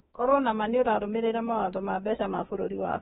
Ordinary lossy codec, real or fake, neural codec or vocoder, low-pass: AAC, 16 kbps; fake; autoencoder, 48 kHz, 32 numbers a frame, DAC-VAE, trained on Japanese speech; 19.8 kHz